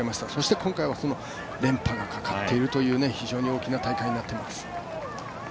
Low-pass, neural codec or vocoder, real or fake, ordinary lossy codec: none; none; real; none